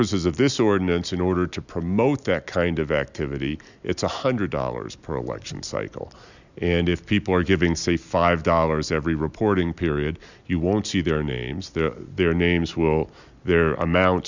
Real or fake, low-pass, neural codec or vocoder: real; 7.2 kHz; none